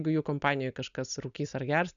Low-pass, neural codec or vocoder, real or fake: 7.2 kHz; none; real